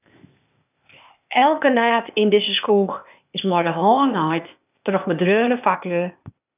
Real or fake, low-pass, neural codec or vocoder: fake; 3.6 kHz; codec, 16 kHz, 0.8 kbps, ZipCodec